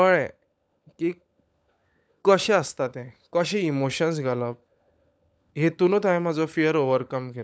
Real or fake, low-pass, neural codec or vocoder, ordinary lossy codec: fake; none; codec, 16 kHz, 16 kbps, FunCodec, trained on LibriTTS, 50 frames a second; none